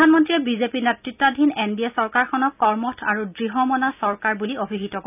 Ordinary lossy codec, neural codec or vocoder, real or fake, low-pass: none; none; real; 3.6 kHz